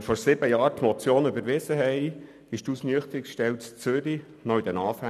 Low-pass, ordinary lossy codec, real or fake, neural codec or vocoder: 14.4 kHz; none; real; none